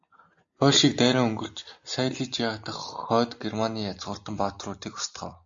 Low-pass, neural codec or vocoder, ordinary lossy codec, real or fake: 7.2 kHz; none; AAC, 64 kbps; real